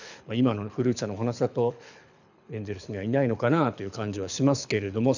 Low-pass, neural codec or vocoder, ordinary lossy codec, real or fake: 7.2 kHz; codec, 24 kHz, 6 kbps, HILCodec; none; fake